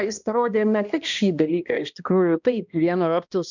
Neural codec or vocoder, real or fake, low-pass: codec, 16 kHz, 1 kbps, X-Codec, HuBERT features, trained on balanced general audio; fake; 7.2 kHz